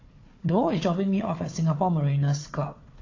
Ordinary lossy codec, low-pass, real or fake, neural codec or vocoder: AAC, 32 kbps; 7.2 kHz; fake; codec, 16 kHz, 4 kbps, FunCodec, trained on Chinese and English, 50 frames a second